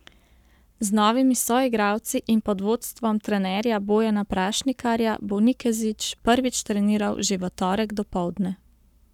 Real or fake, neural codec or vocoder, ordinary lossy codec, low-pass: fake; codec, 44.1 kHz, 7.8 kbps, Pupu-Codec; none; 19.8 kHz